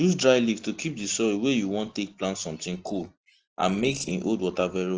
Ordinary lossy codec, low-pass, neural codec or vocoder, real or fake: Opus, 16 kbps; 7.2 kHz; none; real